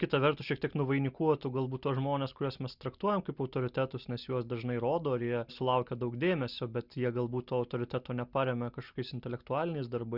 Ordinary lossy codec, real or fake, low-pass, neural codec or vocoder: Opus, 64 kbps; real; 5.4 kHz; none